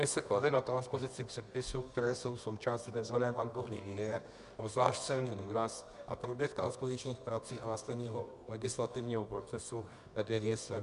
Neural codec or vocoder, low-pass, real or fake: codec, 24 kHz, 0.9 kbps, WavTokenizer, medium music audio release; 10.8 kHz; fake